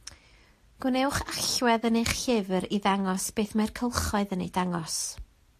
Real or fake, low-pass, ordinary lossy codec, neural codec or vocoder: real; 14.4 kHz; AAC, 64 kbps; none